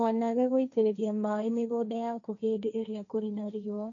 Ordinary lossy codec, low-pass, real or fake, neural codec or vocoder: none; 7.2 kHz; fake; codec, 16 kHz, 1.1 kbps, Voila-Tokenizer